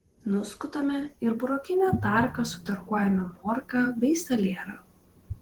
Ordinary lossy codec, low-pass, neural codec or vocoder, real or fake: Opus, 16 kbps; 19.8 kHz; vocoder, 48 kHz, 128 mel bands, Vocos; fake